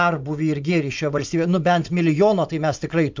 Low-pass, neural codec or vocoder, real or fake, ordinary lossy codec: 7.2 kHz; vocoder, 44.1 kHz, 80 mel bands, Vocos; fake; MP3, 64 kbps